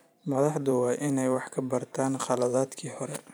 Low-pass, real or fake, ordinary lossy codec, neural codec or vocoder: none; fake; none; vocoder, 44.1 kHz, 128 mel bands every 512 samples, BigVGAN v2